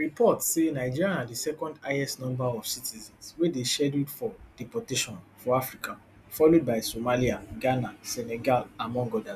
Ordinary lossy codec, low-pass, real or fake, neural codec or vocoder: none; 14.4 kHz; real; none